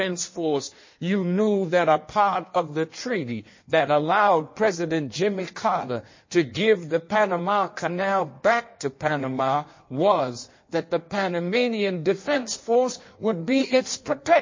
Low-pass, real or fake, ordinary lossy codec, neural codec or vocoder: 7.2 kHz; fake; MP3, 32 kbps; codec, 16 kHz in and 24 kHz out, 1.1 kbps, FireRedTTS-2 codec